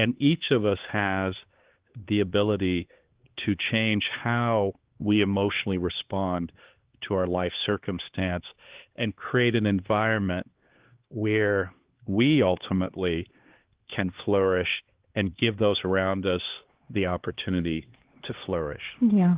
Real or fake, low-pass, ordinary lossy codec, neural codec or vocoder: fake; 3.6 kHz; Opus, 16 kbps; codec, 16 kHz, 2 kbps, X-Codec, HuBERT features, trained on LibriSpeech